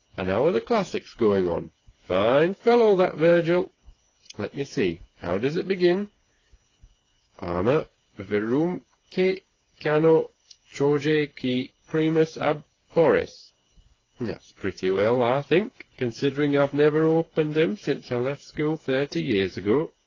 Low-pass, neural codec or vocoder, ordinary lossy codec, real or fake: 7.2 kHz; codec, 16 kHz, 4 kbps, FreqCodec, smaller model; AAC, 32 kbps; fake